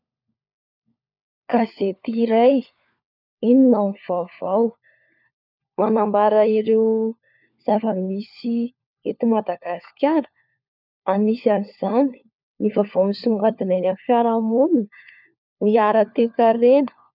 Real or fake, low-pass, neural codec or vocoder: fake; 5.4 kHz; codec, 16 kHz, 4 kbps, FunCodec, trained on LibriTTS, 50 frames a second